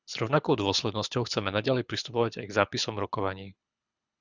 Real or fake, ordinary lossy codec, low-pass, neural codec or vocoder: fake; Opus, 64 kbps; 7.2 kHz; codec, 24 kHz, 6 kbps, HILCodec